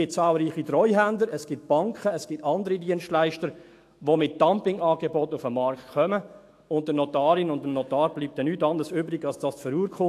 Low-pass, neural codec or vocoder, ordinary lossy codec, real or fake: 14.4 kHz; autoencoder, 48 kHz, 128 numbers a frame, DAC-VAE, trained on Japanese speech; AAC, 64 kbps; fake